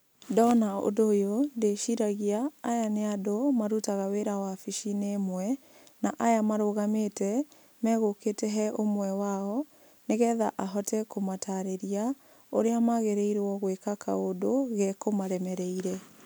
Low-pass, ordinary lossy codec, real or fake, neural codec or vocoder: none; none; real; none